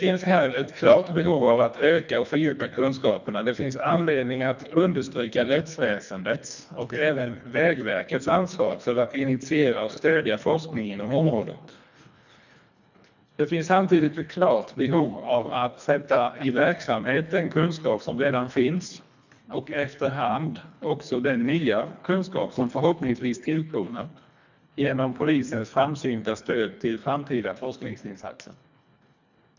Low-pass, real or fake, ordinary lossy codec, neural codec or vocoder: 7.2 kHz; fake; none; codec, 24 kHz, 1.5 kbps, HILCodec